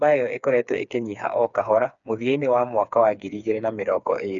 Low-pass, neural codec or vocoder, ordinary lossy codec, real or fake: 7.2 kHz; codec, 16 kHz, 4 kbps, FreqCodec, smaller model; none; fake